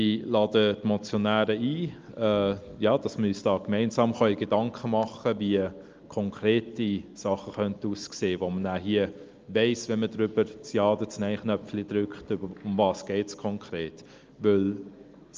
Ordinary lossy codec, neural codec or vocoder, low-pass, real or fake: Opus, 24 kbps; none; 7.2 kHz; real